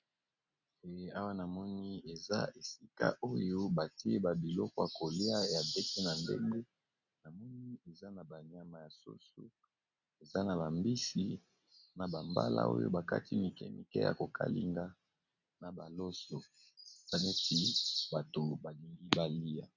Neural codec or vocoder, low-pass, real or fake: none; 7.2 kHz; real